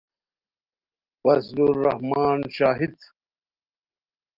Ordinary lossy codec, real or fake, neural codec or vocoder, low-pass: Opus, 32 kbps; real; none; 5.4 kHz